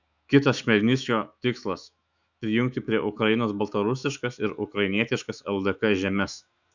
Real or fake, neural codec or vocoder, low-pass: fake; autoencoder, 48 kHz, 128 numbers a frame, DAC-VAE, trained on Japanese speech; 7.2 kHz